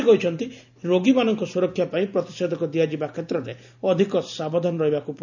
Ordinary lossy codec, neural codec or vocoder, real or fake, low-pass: none; none; real; 7.2 kHz